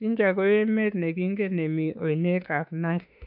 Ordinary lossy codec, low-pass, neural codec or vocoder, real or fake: none; 5.4 kHz; autoencoder, 48 kHz, 32 numbers a frame, DAC-VAE, trained on Japanese speech; fake